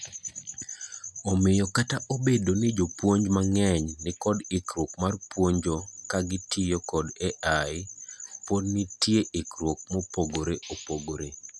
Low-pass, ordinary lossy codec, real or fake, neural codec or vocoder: none; none; real; none